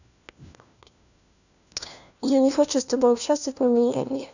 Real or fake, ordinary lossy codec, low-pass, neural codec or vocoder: fake; none; 7.2 kHz; codec, 16 kHz, 1 kbps, FunCodec, trained on LibriTTS, 50 frames a second